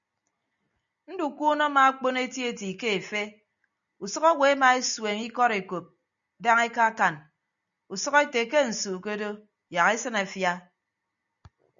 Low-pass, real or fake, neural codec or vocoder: 7.2 kHz; real; none